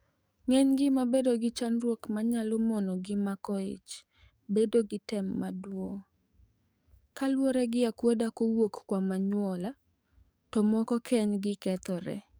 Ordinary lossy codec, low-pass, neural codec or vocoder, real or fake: none; none; codec, 44.1 kHz, 7.8 kbps, DAC; fake